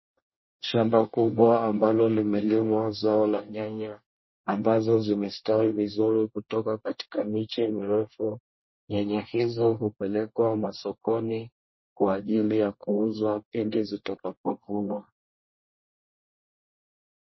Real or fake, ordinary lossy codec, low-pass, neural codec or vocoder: fake; MP3, 24 kbps; 7.2 kHz; codec, 24 kHz, 1 kbps, SNAC